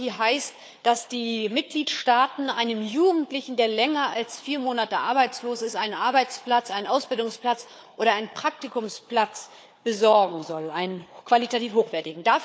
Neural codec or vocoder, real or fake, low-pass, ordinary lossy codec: codec, 16 kHz, 4 kbps, FunCodec, trained on Chinese and English, 50 frames a second; fake; none; none